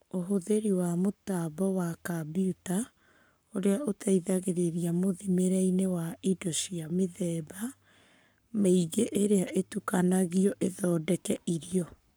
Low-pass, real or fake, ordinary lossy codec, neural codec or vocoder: none; fake; none; codec, 44.1 kHz, 7.8 kbps, Pupu-Codec